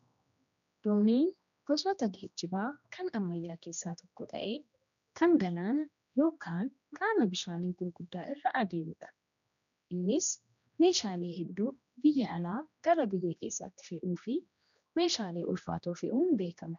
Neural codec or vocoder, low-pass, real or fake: codec, 16 kHz, 1 kbps, X-Codec, HuBERT features, trained on general audio; 7.2 kHz; fake